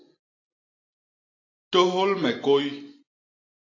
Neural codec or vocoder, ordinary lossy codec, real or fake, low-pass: none; AAC, 32 kbps; real; 7.2 kHz